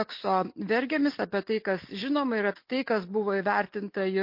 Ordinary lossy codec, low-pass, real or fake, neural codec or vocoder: MP3, 32 kbps; 5.4 kHz; real; none